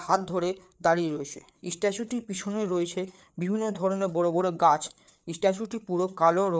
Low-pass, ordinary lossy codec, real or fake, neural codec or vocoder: none; none; fake; codec, 16 kHz, 4 kbps, FreqCodec, larger model